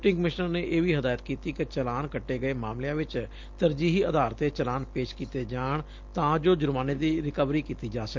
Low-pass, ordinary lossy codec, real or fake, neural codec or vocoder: 7.2 kHz; Opus, 32 kbps; real; none